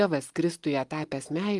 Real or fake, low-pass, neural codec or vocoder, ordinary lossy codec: real; 10.8 kHz; none; Opus, 24 kbps